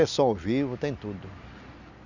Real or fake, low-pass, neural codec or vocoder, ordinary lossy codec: real; 7.2 kHz; none; none